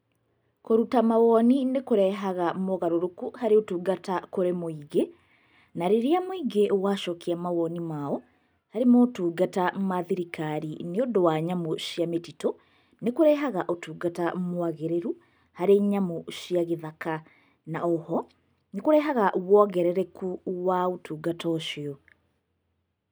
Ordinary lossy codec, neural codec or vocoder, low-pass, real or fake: none; none; none; real